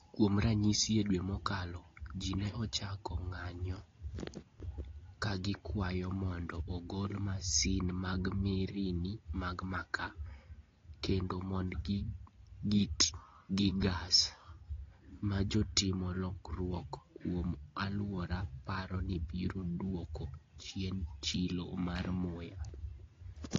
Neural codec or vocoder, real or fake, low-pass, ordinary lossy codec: none; real; 7.2 kHz; AAC, 32 kbps